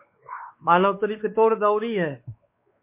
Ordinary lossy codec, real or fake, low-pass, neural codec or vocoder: MP3, 24 kbps; fake; 3.6 kHz; codec, 16 kHz, 2 kbps, X-Codec, WavLM features, trained on Multilingual LibriSpeech